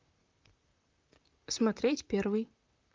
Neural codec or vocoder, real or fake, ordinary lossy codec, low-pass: vocoder, 44.1 kHz, 128 mel bands, Pupu-Vocoder; fake; Opus, 24 kbps; 7.2 kHz